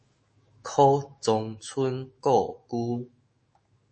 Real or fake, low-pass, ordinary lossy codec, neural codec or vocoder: fake; 9.9 kHz; MP3, 32 kbps; autoencoder, 48 kHz, 128 numbers a frame, DAC-VAE, trained on Japanese speech